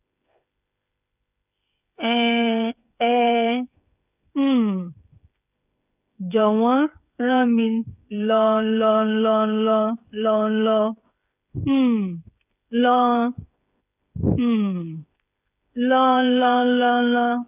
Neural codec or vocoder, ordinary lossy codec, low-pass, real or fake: codec, 16 kHz, 8 kbps, FreqCodec, smaller model; none; 3.6 kHz; fake